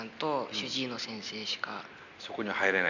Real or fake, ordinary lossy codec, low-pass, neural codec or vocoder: real; none; 7.2 kHz; none